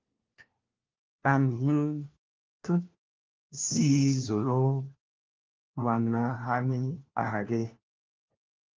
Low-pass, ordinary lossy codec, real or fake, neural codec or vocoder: 7.2 kHz; Opus, 32 kbps; fake; codec, 16 kHz, 1 kbps, FunCodec, trained on LibriTTS, 50 frames a second